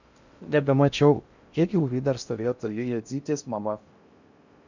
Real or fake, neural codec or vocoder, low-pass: fake; codec, 16 kHz in and 24 kHz out, 0.6 kbps, FocalCodec, streaming, 2048 codes; 7.2 kHz